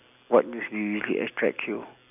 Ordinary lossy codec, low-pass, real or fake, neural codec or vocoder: none; 3.6 kHz; real; none